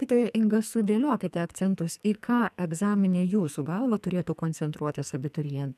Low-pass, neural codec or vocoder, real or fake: 14.4 kHz; codec, 44.1 kHz, 2.6 kbps, SNAC; fake